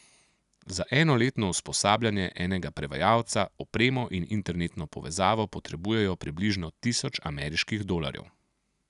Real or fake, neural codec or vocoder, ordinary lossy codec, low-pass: real; none; none; 10.8 kHz